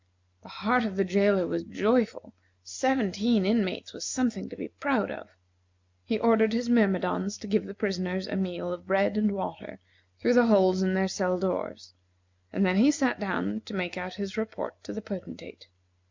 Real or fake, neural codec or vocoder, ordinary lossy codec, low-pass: real; none; MP3, 64 kbps; 7.2 kHz